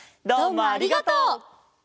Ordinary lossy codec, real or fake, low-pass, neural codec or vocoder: none; real; none; none